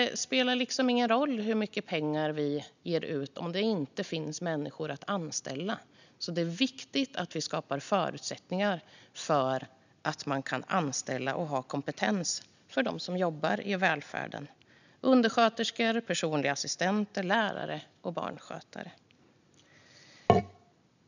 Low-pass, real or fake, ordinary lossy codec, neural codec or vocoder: 7.2 kHz; real; none; none